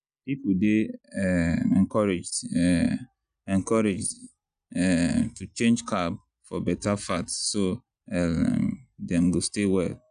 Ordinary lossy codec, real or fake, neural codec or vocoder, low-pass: none; real; none; 9.9 kHz